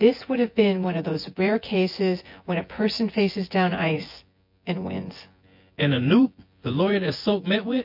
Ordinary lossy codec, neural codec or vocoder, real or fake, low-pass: MP3, 32 kbps; vocoder, 24 kHz, 100 mel bands, Vocos; fake; 5.4 kHz